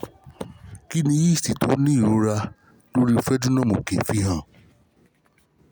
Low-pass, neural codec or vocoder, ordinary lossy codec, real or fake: none; none; none; real